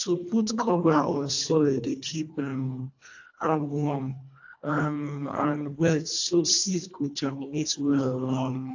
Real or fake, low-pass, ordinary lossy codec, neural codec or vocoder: fake; 7.2 kHz; AAC, 48 kbps; codec, 24 kHz, 1.5 kbps, HILCodec